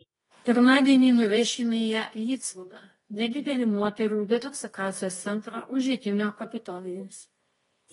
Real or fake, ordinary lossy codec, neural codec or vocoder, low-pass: fake; AAC, 32 kbps; codec, 24 kHz, 0.9 kbps, WavTokenizer, medium music audio release; 10.8 kHz